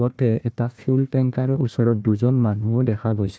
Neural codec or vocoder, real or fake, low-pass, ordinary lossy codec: codec, 16 kHz, 1 kbps, FunCodec, trained on Chinese and English, 50 frames a second; fake; none; none